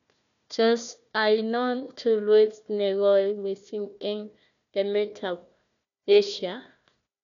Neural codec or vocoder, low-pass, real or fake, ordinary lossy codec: codec, 16 kHz, 1 kbps, FunCodec, trained on Chinese and English, 50 frames a second; 7.2 kHz; fake; none